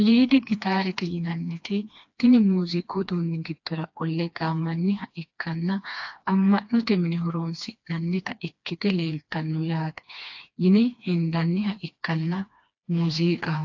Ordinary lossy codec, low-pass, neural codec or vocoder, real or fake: AAC, 48 kbps; 7.2 kHz; codec, 16 kHz, 2 kbps, FreqCodec, smaller model; fake